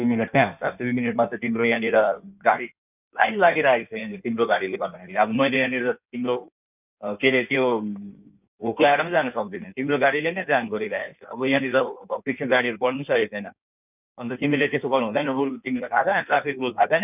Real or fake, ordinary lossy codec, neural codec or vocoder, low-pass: fake; none; codec, 16 kHz in and 24 kHz out, 1.1 kbps, FireRedTTS-2 codec; 3.6 kHz